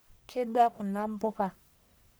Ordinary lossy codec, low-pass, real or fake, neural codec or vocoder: none; none; fake; codec, 44.1 kHz, 1.7 kbps, Pupu-Codec